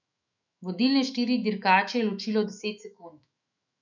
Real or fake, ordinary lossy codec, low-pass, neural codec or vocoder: fake; none; 7.2 kHz; autoencoder, 48 kHz, 128 numbers a frame, DAC-VAE, trained on Japanese speech